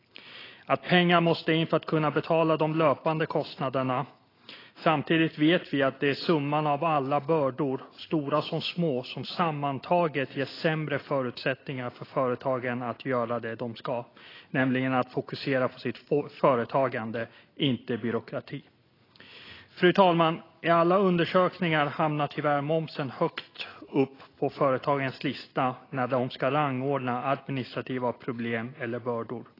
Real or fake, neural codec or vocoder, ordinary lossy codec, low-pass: real; none; AAC, 24 kbps; 5.4 kHz